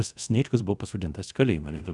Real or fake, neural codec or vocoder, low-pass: fake; codec, 24 kHz, 0.5 kbps, DualCodec; 10.8 kHz